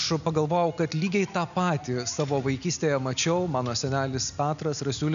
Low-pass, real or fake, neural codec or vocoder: 7.2 kHz; real; none